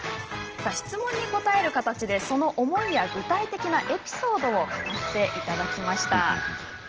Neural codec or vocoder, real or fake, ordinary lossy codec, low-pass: none; real; Opus, 16 kbps; 7.2 kHz